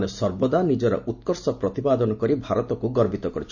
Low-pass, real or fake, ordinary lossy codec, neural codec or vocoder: 7.2 kHz; real; none; none